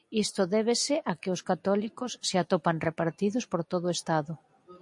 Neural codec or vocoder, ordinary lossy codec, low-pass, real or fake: none; MP3, 48 kbps; 10.8 kHz; real